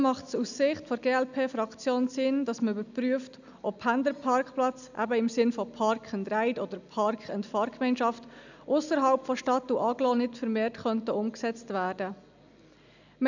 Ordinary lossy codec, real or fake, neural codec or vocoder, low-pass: none; real; none; 7.2 kHz